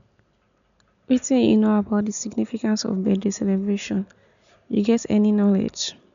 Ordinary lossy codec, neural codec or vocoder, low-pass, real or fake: none; none; 7.2 kHz; real